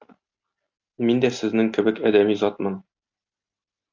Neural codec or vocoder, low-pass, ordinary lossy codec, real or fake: none; 7.2 kHz; AAC, 48 kbps; real